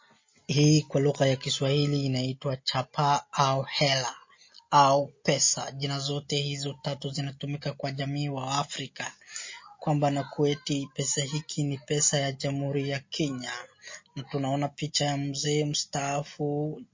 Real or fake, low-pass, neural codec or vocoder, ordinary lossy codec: real; 7.2 kHz; none; MP3, 32 kbps